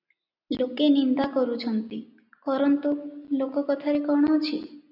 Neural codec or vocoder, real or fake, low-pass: none; real; 5.4 kHz